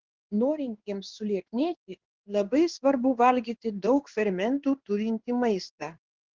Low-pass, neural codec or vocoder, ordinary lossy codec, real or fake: 7.2 kHz; codec, 16 kHz in and 24 kHz out, 1 kbps, XY-Tokenizer; Opus, 16 kbps; fake